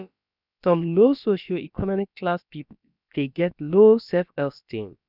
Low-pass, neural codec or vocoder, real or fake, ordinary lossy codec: 5.4 kHz; codec, 16 kHz, about 1 kbps, DyCAST, with the encoder's durations; fake; none